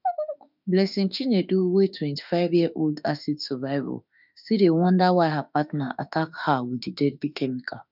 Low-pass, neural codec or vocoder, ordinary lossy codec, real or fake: 5.4 kHz; autoencoder, 48 kHz, 32 numbers a frame, DAC-VAE, trained on Japanese speech; none; fake